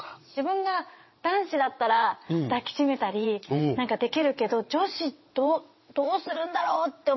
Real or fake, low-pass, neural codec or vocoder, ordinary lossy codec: fake; 7.2 kHz; vocoder, 22.05 kHz, 80 mel bands, WaveNeXt; MP3, 24 kbps